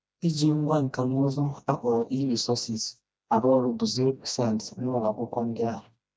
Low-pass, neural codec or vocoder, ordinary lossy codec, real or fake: none; codec, 16 kHz, 1 kbps, FreqCodec, smaller model; none; fake